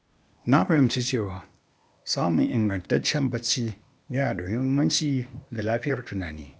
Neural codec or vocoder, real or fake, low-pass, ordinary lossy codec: codec, 16 kHz, 0.8 kbps, ZipCodec; fake; none; none